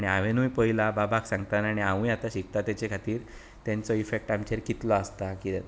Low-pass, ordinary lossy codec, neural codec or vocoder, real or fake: none; none; none; real